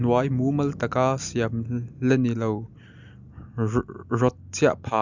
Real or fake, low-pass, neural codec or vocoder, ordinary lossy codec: real; 7.2 kHz; none; none